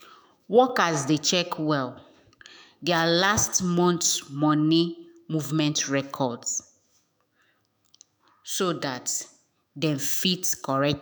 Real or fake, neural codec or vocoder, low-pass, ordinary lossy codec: fake; autoencoder, 48 kHz, 128 numbers a frame, DAC-VAE, trained on Japanese speech; none; none